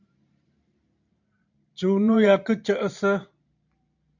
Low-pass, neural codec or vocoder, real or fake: 7.2 kHz; vocoder, 44.1 kHz, 128 mel bands every 512 samples, BigVGAN v2; fake